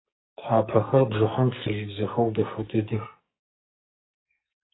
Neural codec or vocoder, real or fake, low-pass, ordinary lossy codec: codec, 44.1 kHz, 2.6 kbps, SNAC; fake; 7.2 kHz; AAC, 16 kbps